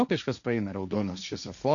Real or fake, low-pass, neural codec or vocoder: fake; 7.2 kHz; codec, 16 kHz, 1.1 kbps, Voila-Tokenizer